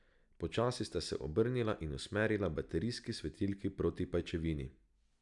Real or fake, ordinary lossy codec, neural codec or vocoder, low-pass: real; none; none; 10.8 kHz